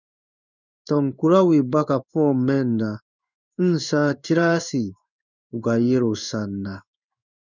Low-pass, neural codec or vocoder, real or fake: 7.2 kHz; codec, 16 kHz in and 24 kHz out, 1 kbps, XY-Tokenizer; fake